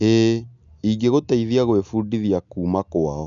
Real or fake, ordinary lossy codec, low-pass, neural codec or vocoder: real; none; 7.2 kHz; none